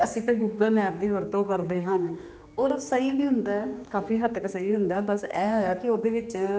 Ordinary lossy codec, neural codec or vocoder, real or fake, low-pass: none; codec, 16 kHz, 2 kbps, X-Codec, HuBERT features, trained on general audio; fake; none